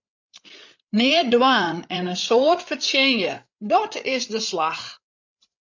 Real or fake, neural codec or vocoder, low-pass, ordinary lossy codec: fake; codec, 16 kHz, 8 kbps, FreqCodec, larger model; 7.2 kHz; MP3, 64 kbps